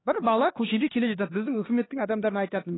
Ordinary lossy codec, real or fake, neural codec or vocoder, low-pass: AAC, 16 kbps; fake; codec, 16 kHz, 2 kbps, X-Codec, HuBERT features, trained on LibriSpeech; 7.2 kHz